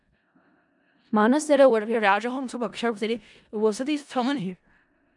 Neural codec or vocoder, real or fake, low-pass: codec, 16 kHz in and 24 kHz out, 0.4 kbps, LongCat-Audio-Codec, four codebook decoder; fake; 10.8 kHz